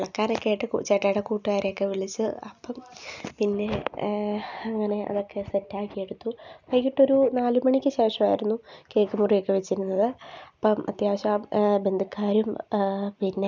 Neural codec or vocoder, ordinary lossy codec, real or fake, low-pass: none; none; real; 7.2 kHz